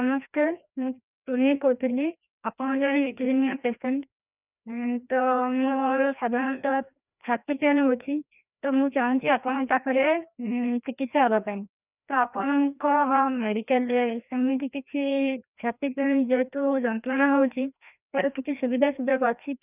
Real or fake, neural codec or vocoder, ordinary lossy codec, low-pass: fake; codec, 16 kHz, 1 kbps, FreqCodec, larger model; none; 3.6 kHz